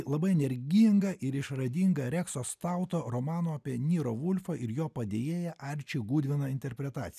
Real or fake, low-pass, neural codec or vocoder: real; 14.4 kHz; none